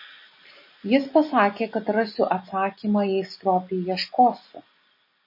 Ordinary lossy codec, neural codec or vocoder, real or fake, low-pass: MP3, 24 kbps; none; real; 5.4 kHz